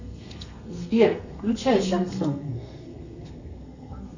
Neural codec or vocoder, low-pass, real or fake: codec, 32 kHz, 1.9 kbps, SNAC; 7.2 kHz; fake